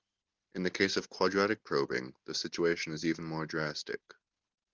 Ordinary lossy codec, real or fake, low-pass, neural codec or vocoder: Opus, 32 kbps; real; 7.2 kHz; none